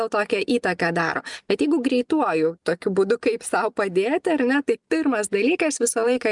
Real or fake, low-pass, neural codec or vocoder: real; 10.8 kHz; none